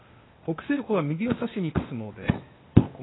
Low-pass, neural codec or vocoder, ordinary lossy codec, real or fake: 7.2 kHz; codec, 16 kHz, 0.8 kbps, ZipCodec; AAC, 16 kbps; fake